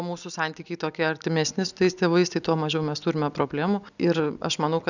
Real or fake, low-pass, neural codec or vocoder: real; 7.2 kHz; none